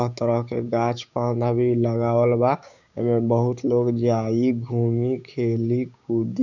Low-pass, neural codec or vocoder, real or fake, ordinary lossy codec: 7.2 kHz; codec, 16 kHz, 6 kbps, DAC; fake; none